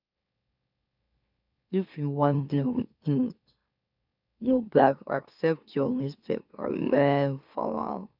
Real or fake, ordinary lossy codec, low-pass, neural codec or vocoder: fake; none; 5.4 kHz; autoencoder, 44.1 kHz, a latent of 192 numbers a frame, MeloTTS